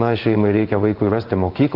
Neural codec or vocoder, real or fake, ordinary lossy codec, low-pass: codec, 16 kHz in and 24 kHz out, 1 kbps, XY-Tokenizer; fake; Opus, 16 kbps; 5.4 kHz